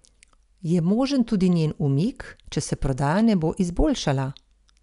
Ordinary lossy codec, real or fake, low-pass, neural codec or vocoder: none; real; 10.8 kHz; none